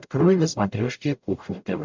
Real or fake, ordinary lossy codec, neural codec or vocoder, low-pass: fake; MP3, 64 kbps; codec, 44.1 kHz, 0.9 kbps, DAC; 7.2 kHz